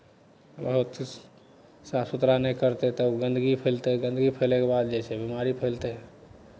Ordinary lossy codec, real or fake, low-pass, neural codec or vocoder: none; real; none; none